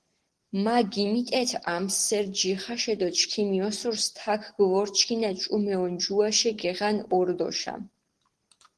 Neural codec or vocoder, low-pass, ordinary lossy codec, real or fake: none; 10.8 kHz; Opus, 16 kbps; real